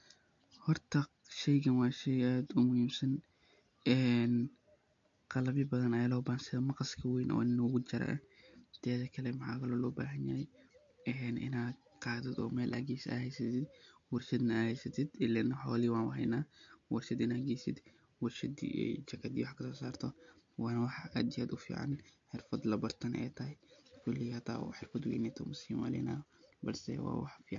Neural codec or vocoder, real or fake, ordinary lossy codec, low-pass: none; real; MP3, 48 kbps; 7.2 kHz